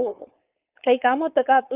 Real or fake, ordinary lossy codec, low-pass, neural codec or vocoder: fake; Opus, 32 kbps; 3.6 kHz; codec, 16 kHz, 4.8 kbps, FACodec